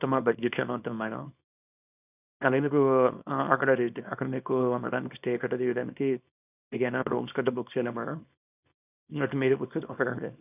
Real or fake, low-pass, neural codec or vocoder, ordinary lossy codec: fake; 3.6 kHz; codec, 24 kHz, 0.9 kbps, WavTokenizer, small release; none